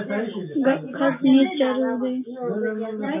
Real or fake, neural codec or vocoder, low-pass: real; none; 3.6 kHz